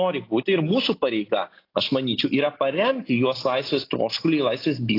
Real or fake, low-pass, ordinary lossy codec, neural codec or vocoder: real; 5.4 kHz; AAC, 32 kbps; none